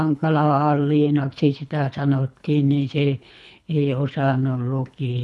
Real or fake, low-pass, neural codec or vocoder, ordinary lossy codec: fake; none; codec, 24 kHz, 3 kbps, HILCodec; none